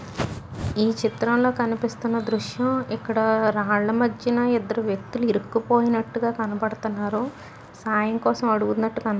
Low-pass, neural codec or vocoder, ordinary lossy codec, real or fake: none; none; none; real